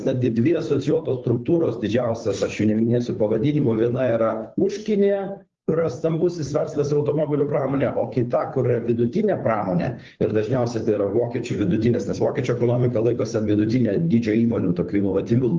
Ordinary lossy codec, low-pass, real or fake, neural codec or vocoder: Opus, 32 kbps; 7.2 kHz; fake; codec, 16 kHz, 2 kbps, FunCodec, trained on Chinese and English, 25 frames a second